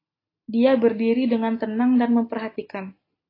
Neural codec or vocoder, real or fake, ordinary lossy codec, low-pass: none; real; AAC, 24 kbps; 5.4 kHz